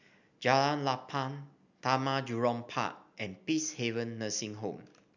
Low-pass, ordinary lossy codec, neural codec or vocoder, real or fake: 7.2 kHz; none; none; real